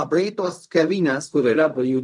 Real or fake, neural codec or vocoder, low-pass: fake; codec, 16 kHz in and 24 kHz out, 0.4 kbps, LongCat-Audio-Codec, fine tuned four codebook decoder; 10.8 kHz